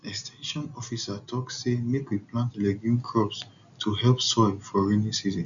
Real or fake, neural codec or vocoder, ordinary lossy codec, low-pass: real; none; none; 7.2 kHz